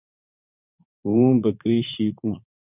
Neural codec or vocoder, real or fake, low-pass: vocoder, 44.1 kHz, 80 mel bands, Vocos; fake; 3.6 kHz